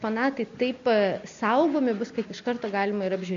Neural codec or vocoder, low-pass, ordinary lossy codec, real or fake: none; 7.2 kHz; MP3, 48 kbps; real